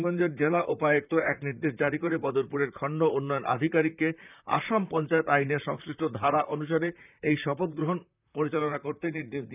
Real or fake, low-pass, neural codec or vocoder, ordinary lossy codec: fake; 3.6 kHz; vocoder, 44.1 kHz, 128 mel bands, Pupu-Vocoder; none